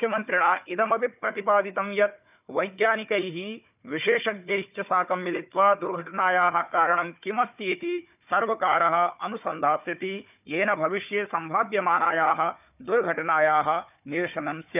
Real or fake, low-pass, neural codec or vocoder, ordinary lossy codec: fake; 3.6 kHz; codec, 16 kHz, 4 kbps, FunCodec, trained on Chinese and English, 50 frames a second; none